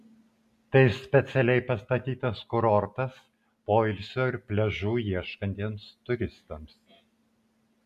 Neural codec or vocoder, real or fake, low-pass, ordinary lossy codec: none; real; 14.4 kHz; MP3, 96 kbps